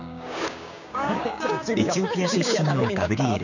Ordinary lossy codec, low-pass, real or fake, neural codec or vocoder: none; 7.2 kHz; fake; codec, 16 kHz, 6 kbps, DAC